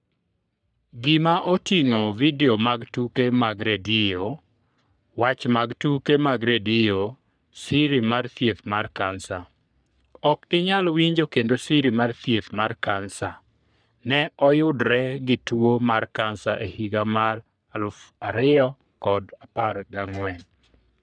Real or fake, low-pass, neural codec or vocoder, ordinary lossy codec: fake; 9.9 kHz; codec, 44.1 kHz, 3.4 kbps, Pupu-Codec; none